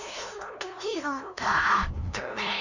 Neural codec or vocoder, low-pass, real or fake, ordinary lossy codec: codec, 16 kHz, 0.5 kbps, FunCodec, trained on LibriTTS, 25 frames a second; 7.2 kHz; fake; none